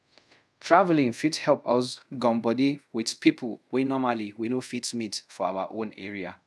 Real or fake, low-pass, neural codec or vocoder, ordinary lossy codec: fake; none; codec, 24 kHz, 0.5 kbps, DualCodec; none